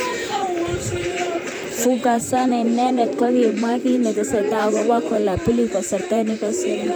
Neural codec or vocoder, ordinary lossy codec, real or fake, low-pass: vocoder, 44.1 kHz, 128 mel bands, Pupu-Vocoder; none; fake; none